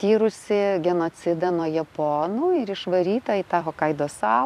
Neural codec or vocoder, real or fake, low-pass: vocoder, 44.1 kHz, 128 mel bands every 256 samples, BigVGAN v2; fake; 14.4 kHz